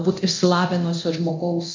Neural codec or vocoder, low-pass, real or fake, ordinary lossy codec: codec, 24 kHz, 0.9 kbps, DualCodec; 7.2 kHz; fake; MP3, 64 kbps